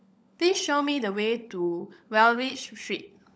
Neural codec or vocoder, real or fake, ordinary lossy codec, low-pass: codec, 16 kHz, 16 kbps, FunCodec, trained on LibriTTS, 50 frames a second; fake; none; none